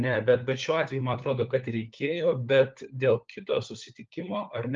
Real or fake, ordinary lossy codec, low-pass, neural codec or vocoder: fake; Opus, 24 kbps; 7.2 kHz; codec, 16 kHz, 4 kbps, FunCodec, trained on LibriTTS, 50 frames a second